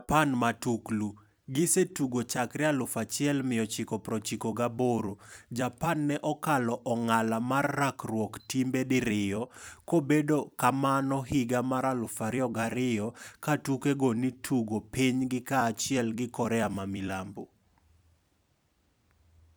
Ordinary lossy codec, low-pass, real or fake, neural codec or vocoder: none; none; fake; vocoder, 44.1 kHz, 128 mel bands every 256 samples, BigVGAN v2